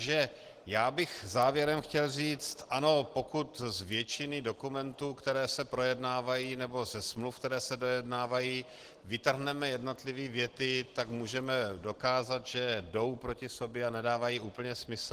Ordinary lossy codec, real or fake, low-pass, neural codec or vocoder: Opus, 16 kbps; real; 14.4 kHz; none